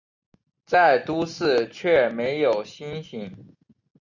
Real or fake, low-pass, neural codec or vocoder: real; 7.2 kHz; none